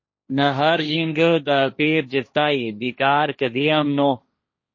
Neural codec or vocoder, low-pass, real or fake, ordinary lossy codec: codec, 16 kHz, 1.1 kbps, Voila-Tokenizer; 7.2 kHz; fake; MP3, 32 kbps